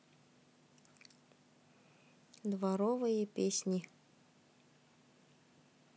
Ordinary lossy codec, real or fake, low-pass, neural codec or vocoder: none; real; none; none